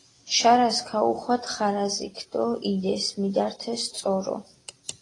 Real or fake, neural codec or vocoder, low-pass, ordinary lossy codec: real; none; 10.8 kHz; AAC, 32 kbps